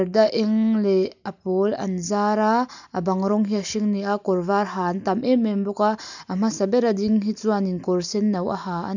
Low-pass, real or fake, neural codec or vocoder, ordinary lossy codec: 7.2 kHz; real; none; none